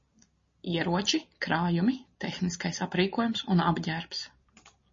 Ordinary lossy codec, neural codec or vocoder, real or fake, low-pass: MP3, 32 kbps; none; real; 7.2 kHz